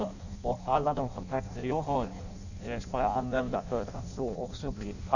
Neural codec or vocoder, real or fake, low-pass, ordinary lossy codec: codec, 16 kHz in and 24 kHz out, 0.6 kbps, FireRedTTS-2 codec; fake; 7.2 kHz; none